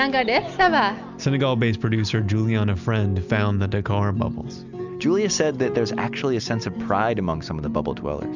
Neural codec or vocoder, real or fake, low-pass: none; real; 7.2 kHz